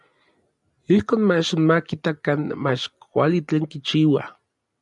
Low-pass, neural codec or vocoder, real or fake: 10.8 kHz; none; real